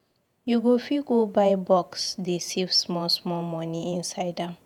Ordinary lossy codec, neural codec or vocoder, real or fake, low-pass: none; vocoder, 48 kHz, 128 mel bands, Vocos; fake; 19.8 kHz